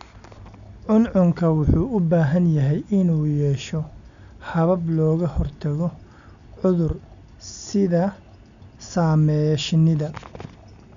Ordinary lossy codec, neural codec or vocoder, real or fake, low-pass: none; none; real; 7.2 kHz